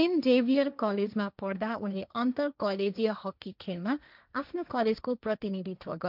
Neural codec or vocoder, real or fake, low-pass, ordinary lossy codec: codec, 16 kHz, 1.1 kbps, Voila-Tokenizer; fake; 5.4 kHz; none